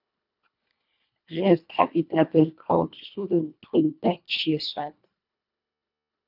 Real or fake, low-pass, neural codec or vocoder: fake; 5.4 kHz; codec, 24 kHz, 1.5 kbps, HILCodec